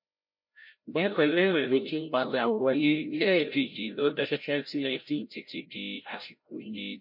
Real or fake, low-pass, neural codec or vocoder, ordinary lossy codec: fake; 5.4 kHz; codec, 16 kHz, 0.5 kbps, FreqCodec, larger model; MP3, 32 kbps